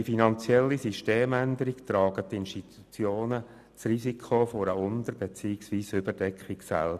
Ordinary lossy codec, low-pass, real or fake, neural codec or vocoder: none; 14.4 kHz; real; none